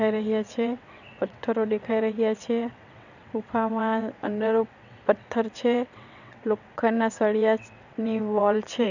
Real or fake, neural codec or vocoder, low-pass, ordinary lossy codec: fake; vocoder, 22.05 kHz, 80 mel bands, WaveNeXt; 7.2 kHz; none